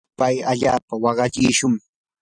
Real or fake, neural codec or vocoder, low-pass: fake; vocoder, 44.1 kHz, 128 mel bands every 256 samples, BigVGAN v2; 9.9 kHz